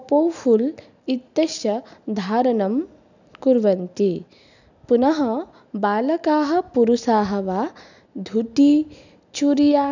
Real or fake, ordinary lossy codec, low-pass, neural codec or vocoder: real; none; 7.2 kHz; none